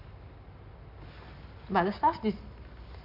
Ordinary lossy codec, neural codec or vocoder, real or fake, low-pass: none; none; real; 5.4 kHz